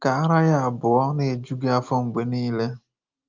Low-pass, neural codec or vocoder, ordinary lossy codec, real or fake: 7.2 kHz; none; Opus, 24 kbps; real